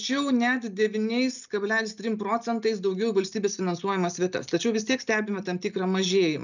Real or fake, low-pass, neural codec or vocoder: real; 7.2 kHz; none